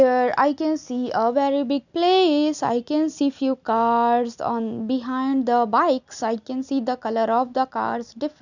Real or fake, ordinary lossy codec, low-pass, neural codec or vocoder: real; none; 7.2 kHz; none